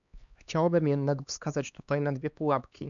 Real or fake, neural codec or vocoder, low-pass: fake; codec, 16 kHz, 2 kbps, X-Codec, HuBERT features, trained on LibriSpeech; 7.2 kHz